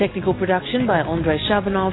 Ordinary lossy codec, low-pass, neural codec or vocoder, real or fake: AAC, 16 kbps; 7.2 kHz; none; real